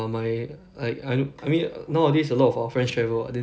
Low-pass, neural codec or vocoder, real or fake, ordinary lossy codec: none; none; real; none